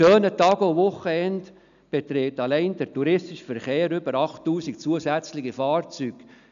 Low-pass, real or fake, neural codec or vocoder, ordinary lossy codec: 7.2 kHz; real; none; none